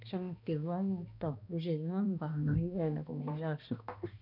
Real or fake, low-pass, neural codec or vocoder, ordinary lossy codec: fake; 5.4 kHz; codec, 16 kHz, 1 kbps, X-Codec, HuBERT features, trained on balanced general audio; none